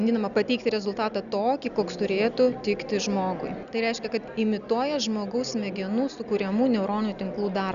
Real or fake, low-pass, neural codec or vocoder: real; 7.2 kHz; none